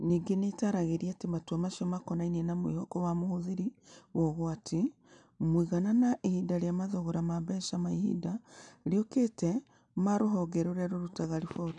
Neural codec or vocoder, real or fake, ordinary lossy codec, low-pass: none; real; none; 9.9 kHz